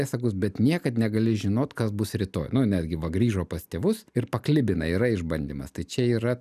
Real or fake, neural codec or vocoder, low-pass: real; none; 14.4 kHz